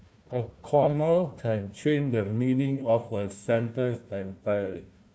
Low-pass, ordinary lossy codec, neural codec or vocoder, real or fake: none; none; codec, 16 kHz, 1 kbps, FunCodec, trained on Chinese and English, 50 frames a second; fake